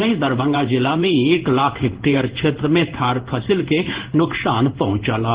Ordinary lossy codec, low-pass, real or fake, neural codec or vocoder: Opus, 16 kbps; 3.6 kHz; fake; codec, 16 kHz in and 24 kHz out, 1 kbps, XY-Tokenizer